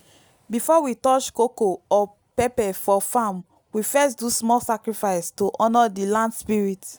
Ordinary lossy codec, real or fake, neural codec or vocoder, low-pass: none; real; none; none